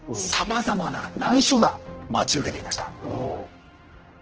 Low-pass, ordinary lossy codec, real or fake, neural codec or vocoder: 7.2 kHz; Opus, 16 kbps; fake; codec, 16 kHz, 1 kbps, X-Codec, HuBERT features, trained on general audio